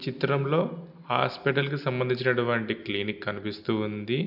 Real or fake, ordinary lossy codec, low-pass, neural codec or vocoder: real; none; 5.4 kHz; none